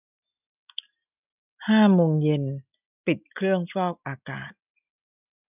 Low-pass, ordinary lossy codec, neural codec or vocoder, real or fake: 3.6 kHz; none; none; real